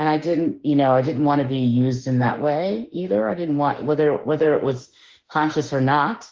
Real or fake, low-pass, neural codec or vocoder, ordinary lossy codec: fake; 7.2 kHz; autoencoder, 48 kHz, 32 numbers a frame, DAC-VAE, trained on Japanese speech; Opus, 16 kbps